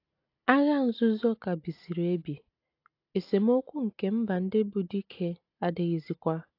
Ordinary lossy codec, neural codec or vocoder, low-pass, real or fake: AAC, 32 kbps; none; 5.4 kHz; real